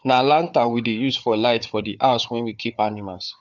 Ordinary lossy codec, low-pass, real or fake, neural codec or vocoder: none; 7.2 kHz; fake; codec, 16 kHz, 4 kbps, FunCodec, trained on Chinese and English, 50 frames a second